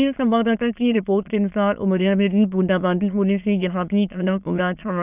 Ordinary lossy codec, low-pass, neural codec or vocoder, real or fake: none; 3.6 kHz; autoencoder, 22.05 kHz, a latent of 192 numbers a frame, VITS, trained on many speakers; fake